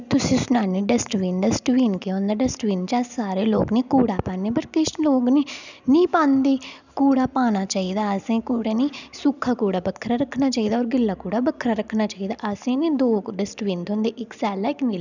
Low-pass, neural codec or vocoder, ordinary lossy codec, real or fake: 7.2 kHz; none; none; real